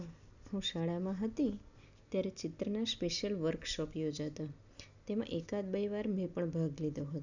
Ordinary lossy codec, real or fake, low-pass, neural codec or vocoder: none; real; 7.2 kHz; none